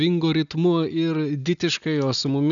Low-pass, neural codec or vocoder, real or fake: 7.2 kHz; none; real